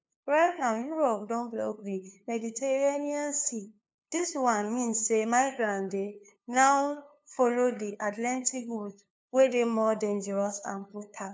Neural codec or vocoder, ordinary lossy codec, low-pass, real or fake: codec, 16 kHz, 2 kbps, FunCodec, trained on LibriTTS, 25 frames a second; none; none; fake